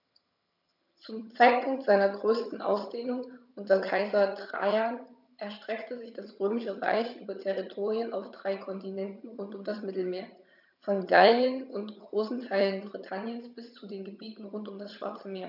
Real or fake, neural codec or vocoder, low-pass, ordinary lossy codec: fake; vocoder, 22.05 kHz, 80 mel bands, HiFi-GAN; 5.4 kHz; none